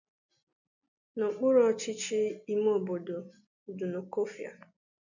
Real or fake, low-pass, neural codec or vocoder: real; 7.2 kHz; none